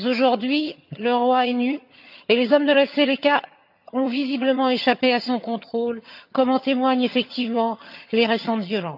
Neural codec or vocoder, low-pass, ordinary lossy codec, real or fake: vocoder, 22.05 kHz, 80 mel bands, HiFi-GAN; 5.4 kHz; none; fake